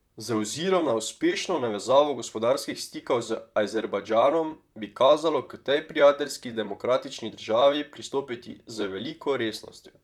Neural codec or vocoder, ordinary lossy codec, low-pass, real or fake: vocoder, 44.1 kHz, 128 mel bands, Pupu-Vocoder; none; 19.8 kHz; fake